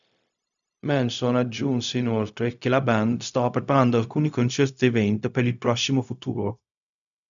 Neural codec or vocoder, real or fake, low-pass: codec, 16 kHz, 0.4 kbps, LongCat-Audio-Codec; fake; 7.2 kHz